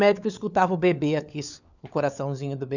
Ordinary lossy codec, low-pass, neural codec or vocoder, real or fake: none; 7.2 kHz; codec, 16 kHz, 4 kbps, FunCodec, trained on LibriTTS, 50 frames a second; fake